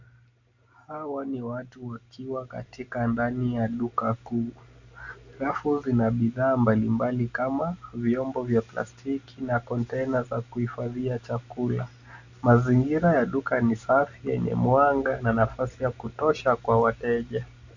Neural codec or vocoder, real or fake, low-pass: none; real; 7.2 kHz